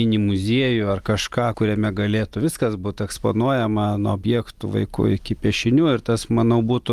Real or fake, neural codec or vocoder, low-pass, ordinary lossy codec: real; none; 14.4 kHz; Opus, 32 kbps